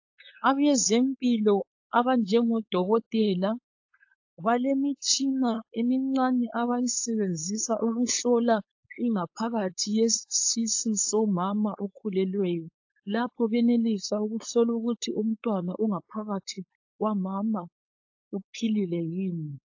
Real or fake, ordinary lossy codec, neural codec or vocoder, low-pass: fake; AAC, 48 kbps; codec, 16 kHz, 4.8 kbps, FACodec; 7.2 kHz